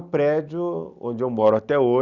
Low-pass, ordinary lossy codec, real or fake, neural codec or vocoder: 7.2 kHz; Opus, 64 kbps; real; none